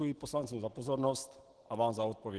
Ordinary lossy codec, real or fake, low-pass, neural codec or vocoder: Opus, 16 kbps; real; 10.8 kHz; none